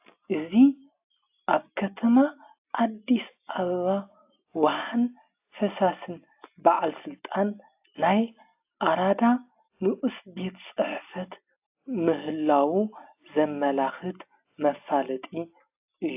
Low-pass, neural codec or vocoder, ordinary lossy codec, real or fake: 3.6 kHz; none; AAC, 32 kbps; real